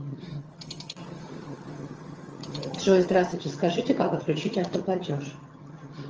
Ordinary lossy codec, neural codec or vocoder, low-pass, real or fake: Opus, 24 kbps; vocoder, 22.05 kHz, 80 mel bands, HiFi-GAN; 7.2 kHz; fake